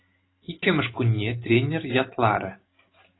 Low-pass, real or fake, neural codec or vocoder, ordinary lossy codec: 7.2 kHz; real; none; AAC, 16 kbps